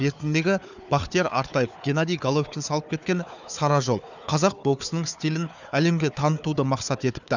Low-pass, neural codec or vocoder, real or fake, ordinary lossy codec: 7.2 kHz; codec, 16 kHz, 4 kbps, FunCodec, trained on Chinese and English, 50 frames a second; fake; none